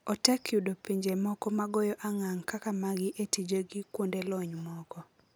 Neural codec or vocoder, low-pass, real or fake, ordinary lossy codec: none; none; real; none